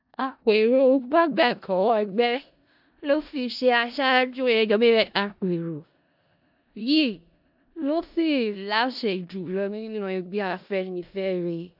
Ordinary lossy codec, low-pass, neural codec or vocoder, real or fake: AAC, 48 kbps; 5.4 kHz; codec, 16 kHz in and 24 kHz out, 0.4 kbps, LongCat-Audio-Codec, four codebook decoder; fake